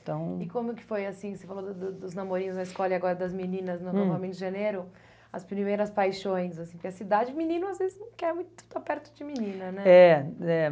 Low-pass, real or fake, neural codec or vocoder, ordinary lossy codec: none; real; none; none